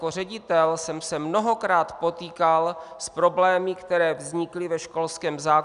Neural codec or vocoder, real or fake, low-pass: none; real; 10.8 kHz